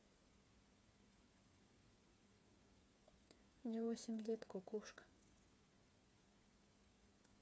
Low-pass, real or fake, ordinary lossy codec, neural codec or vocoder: none; fake; none; codec, 16 kHz, 8 kbps, FreqCodec, smaller model